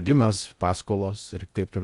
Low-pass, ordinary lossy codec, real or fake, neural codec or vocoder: 10.8 kHz; Opus, 64 kbps; fake; codec, 16 kHz in and 24 kHz out, 0.6 kbps, FocalCodec, streaming, 2048 codes